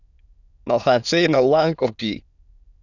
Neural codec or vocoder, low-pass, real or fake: autoencoder, 22.05 kHz, a latent of 192 numbers a frame, VITS, trained on many speakers; 7.2 kHz; fake